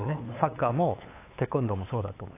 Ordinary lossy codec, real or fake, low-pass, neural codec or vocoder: AAC, 24 kbps; fake; 3.6 kHz; codec, 16 kHz, 8 kbps, FunCodec, trained on LibriTTS, 25 frames a second